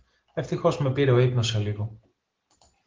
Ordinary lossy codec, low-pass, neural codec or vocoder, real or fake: Opus, 16 kbps; 7.2 kHz; none; real